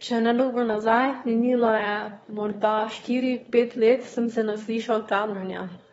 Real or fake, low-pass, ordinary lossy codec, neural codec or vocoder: fake; 9.9 kHz; AAC, 24 kbps; autoencoder, 22.05 kHz, a latent of 192 numbers a frame, VITS, trained on one speaker